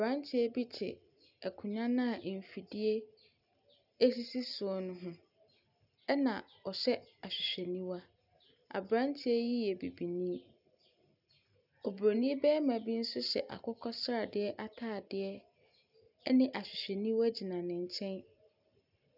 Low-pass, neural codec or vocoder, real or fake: 5.4 kHz; none; real